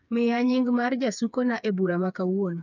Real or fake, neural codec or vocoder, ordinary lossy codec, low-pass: fake; codec, 16 kHz, 4 kbps, FreqCodec, smaller model; none; 7.2 kHz